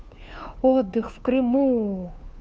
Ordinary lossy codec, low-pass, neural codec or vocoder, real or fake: none; none; codec, 16 kHz, 2 kbps, FunCodec, trained on Chinese and English, 25 frames a second; fake